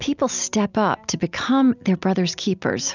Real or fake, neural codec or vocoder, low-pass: real; none; 7.2 kHz